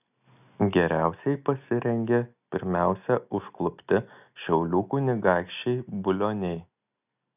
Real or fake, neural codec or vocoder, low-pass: real; none; 3.6 kHz